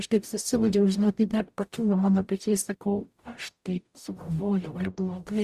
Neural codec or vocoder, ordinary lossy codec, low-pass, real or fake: codec, 44.1 kHz, 0.9 kbps, DAC; Opus, 64 kbps; 14.4 kHz; fake